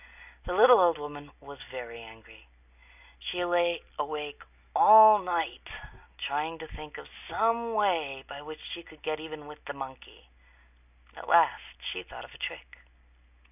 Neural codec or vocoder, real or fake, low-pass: none; real; 3.6 kHz